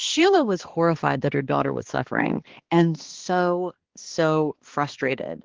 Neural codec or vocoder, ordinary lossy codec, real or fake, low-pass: codec, 16 kHz, 2 kbps, X-Codec, HuBERT features, trained on balanced general audio; Opus, 16 kbps; fake; 7.2 kHz